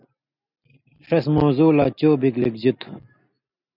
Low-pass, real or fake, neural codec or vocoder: 5.4 kHz; real; none